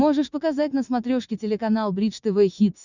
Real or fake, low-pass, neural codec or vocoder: fake; 7.2 kHz; vocoder, 44.1 kHz, 80 mel bands, Vocos